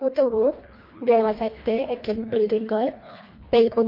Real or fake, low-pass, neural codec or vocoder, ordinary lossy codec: fake; 5.4 kHz; codec, 24 kHz, 1.5 kbps, HILCodec; MP3, 32 kbps